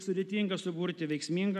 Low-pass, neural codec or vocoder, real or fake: 14.4 kHz; none; real